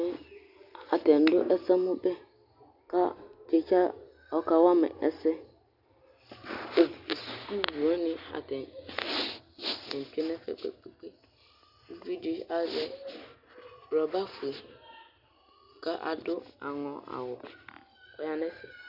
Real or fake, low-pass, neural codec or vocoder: real; 5.4 kHz; none